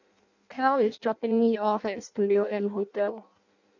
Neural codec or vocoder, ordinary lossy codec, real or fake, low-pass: codec, 16 kHz in and 24 kHz out, 0.6 kbps, FireRedTTS-2 codec; none; fake; 7.2 kHz